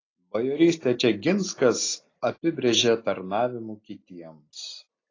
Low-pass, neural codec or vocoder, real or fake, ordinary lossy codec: 7.2 kHz; none; real; AAC, 32 kbps